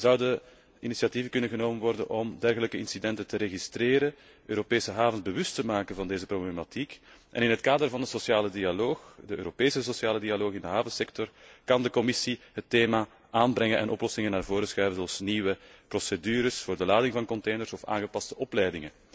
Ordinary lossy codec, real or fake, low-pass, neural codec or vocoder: none; real; none; none